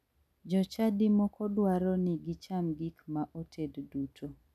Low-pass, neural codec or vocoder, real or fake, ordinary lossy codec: 14.4 kHz; none; real; none